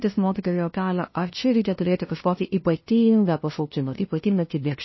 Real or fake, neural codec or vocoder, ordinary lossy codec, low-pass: fake; codec, 16 kHz, 0.5 kbps, FunCodec, trained on LibriTTS, 25 frames a second; MP3, 24 kbps; 7.2 kHz